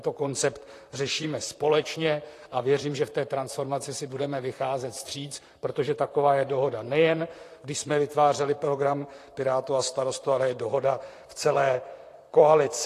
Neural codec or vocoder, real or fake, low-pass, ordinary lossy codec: vocoder, 44.1 kHz, 128 mel bands, Pupu-Vocoder; fake; 14.4 kHz; AAC, 48 kbps